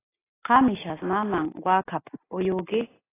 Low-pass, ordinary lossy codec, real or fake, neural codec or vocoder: 3.6 kHz; AAC, 16 kbps; real; none